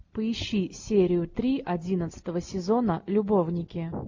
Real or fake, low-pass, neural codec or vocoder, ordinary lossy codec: real; 7.2 kHz; none; MP3, 48 kbps